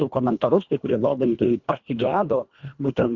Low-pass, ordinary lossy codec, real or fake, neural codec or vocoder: 7.2 kHz; Opus, 64 kbps; fake; codec, 24 kHz, 1.5 kbps, HILCodec